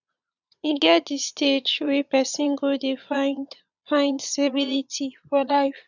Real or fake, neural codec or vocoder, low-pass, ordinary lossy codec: fake; vocoder, 22.05 kHz, 80 mel bands, Vocos; 7.2 kHz; none